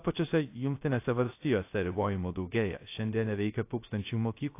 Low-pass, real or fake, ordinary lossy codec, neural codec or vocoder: 3.6 kHz; fake; AAC, 24 kbps; codec, 16 kHz, 0.3 kbps, FocalCodec